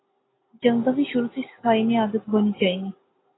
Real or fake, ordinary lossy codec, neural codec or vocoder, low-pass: real; AAC, 16 kbps; none; 7.2 kHz